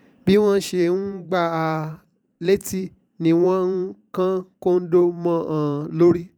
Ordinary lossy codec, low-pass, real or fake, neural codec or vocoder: none; 19.8 kHz; fake; vocoder, 44.1 kHz, 128 mel bands every 512 samples, BigVGAN v2